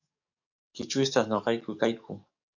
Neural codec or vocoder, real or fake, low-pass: codec, 44.1 kHz, 7.8 kbps, DAC; fake; 7.2 kHz